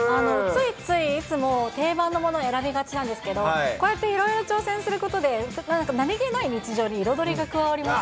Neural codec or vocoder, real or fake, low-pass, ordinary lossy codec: none; real; none; none